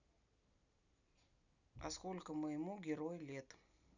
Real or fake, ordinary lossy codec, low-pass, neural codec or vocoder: real; none; 7.2 kHz; none